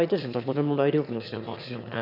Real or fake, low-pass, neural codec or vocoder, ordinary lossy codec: fake; 5.4 kHz; autoencoder, 22.05 kHz, a latent of 192 numbers a frame, VITS, trained on one speaker; AAC, 48 kbps